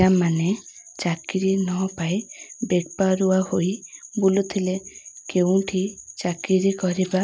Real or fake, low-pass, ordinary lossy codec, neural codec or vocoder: real; none; none; none